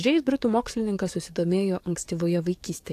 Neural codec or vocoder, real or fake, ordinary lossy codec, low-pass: codec, 44.1 kHz, 7.8 kbps, DAC; fake; AAC, 64 kbps; 14.4 kHz